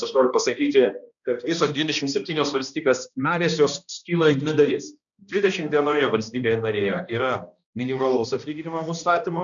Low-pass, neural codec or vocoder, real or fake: 7.2 kHz; codec, 16 kHz, 1 kbps, X-Codec, HuBERT features, trained on balanced general audio; fake